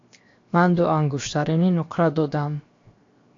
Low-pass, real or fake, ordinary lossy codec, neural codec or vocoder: 7.2 kHz; fake; AAC, 48 kbps; codec, 16 kHz, 0.7 kbps, FocalCodec